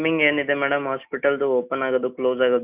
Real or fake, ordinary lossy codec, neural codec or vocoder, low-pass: real; none; none; 3.6 kHz